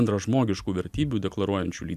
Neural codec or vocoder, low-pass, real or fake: none; 14.4 kHz; real